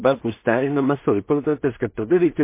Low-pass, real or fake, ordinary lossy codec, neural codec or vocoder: 3.6 kHz; fake; MP3, 24 kbps; codec, 16 kHz in and 24 kHz out, 0.4 kbps, LongCat-Audio-Codec, two codebook decoder